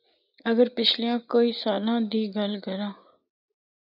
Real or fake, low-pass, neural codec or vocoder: real; 5.4 kHz; none